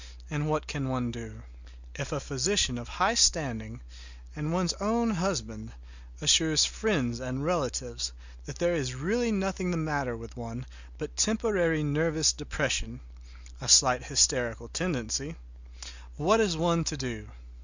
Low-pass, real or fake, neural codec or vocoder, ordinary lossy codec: 7.2 kHz; real; none; Opus, 64 kbps